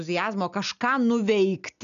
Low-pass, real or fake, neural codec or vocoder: 7.2 kHz; real; none